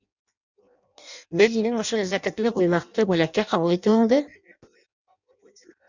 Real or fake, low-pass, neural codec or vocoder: fake; 7.2 kHz; codec, 16 kHz in and 24 kHz out, 0.6 kbps, FireRedTTS-2 codec